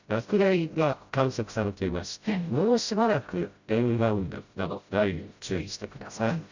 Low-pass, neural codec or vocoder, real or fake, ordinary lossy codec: 7.2 kHz; codec, 16 kHz, 0.5 kbps, FreqCodec, smaller model; fake; Opus, 64 kbps